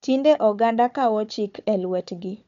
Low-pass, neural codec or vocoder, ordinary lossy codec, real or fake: 7.2 kHz; codec, 16 kHz, 4 kbps, FunCodec, trained on Chinese and English, 50 frames a second; none; fake